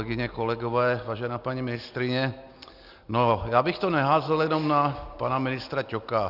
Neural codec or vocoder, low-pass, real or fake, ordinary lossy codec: none; 5.4 kHz; real; Opus, 64 kbps